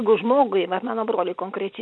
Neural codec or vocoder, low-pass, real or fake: none; 9.9 kHz; real